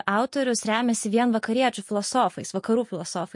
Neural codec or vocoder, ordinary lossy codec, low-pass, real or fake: none; MP3, 48 kbps; 10.8 kHz; real